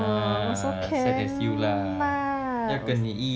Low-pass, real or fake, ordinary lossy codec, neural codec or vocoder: none; real; none; none